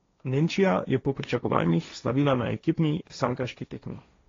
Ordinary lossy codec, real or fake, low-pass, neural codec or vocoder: AAC, 32 kbps; fake; 7.2 kHz; codec, 16 kHz, 1.1 kbps, Voila-Tokenizer